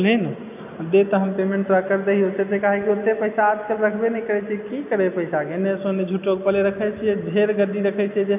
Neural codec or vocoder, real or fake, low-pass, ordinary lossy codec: none; real; 3.6 kHz; none